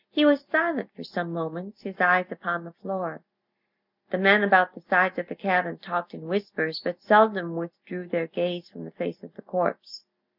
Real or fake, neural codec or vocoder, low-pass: real; none; 5.4 kHz